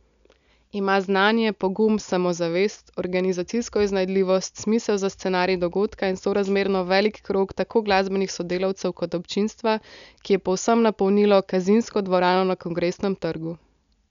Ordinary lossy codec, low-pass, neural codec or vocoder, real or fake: none; 7.2 kHz; none; real